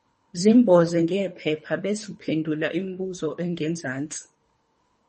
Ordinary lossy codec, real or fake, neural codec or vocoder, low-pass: MP3, 32 kbps; fake; codec, 24 kHz, 3 kbps, HILCodec; 10.8 kHz